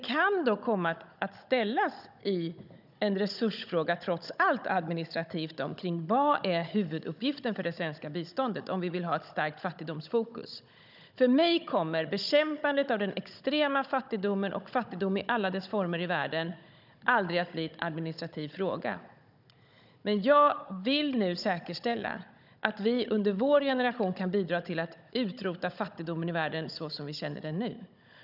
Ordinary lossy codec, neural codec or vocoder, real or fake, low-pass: none; codec, 16 kHz, 16 kbps, FunCodec, trained on Chinese and English, 50 frames a second; fake; 5.4 kHz